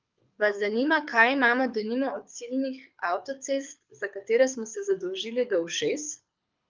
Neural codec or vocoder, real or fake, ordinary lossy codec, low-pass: codec, 24 kHz, 6 kbps, HILCodec; fake; Opus, 24 kbps; 7.2 kHz